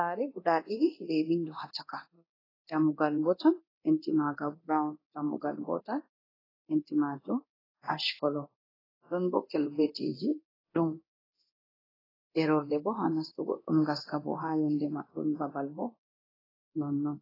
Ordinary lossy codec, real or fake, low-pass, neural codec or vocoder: AAC, 24 kbps; fake; 5.4 kHz; codec, 24 kHz, 0.9 kbps, DualCodec